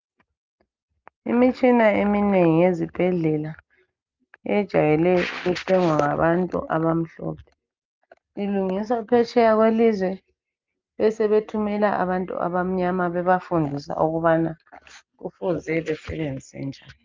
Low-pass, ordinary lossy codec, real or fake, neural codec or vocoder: 7.2 kHz; Opus, 24 kbps; real; none